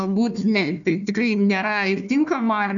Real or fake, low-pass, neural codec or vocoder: fake; 7.2 kHz; codec, 16 kHz, 1 kbps, FunCodec, trained on Chinese and English, 50 frames a second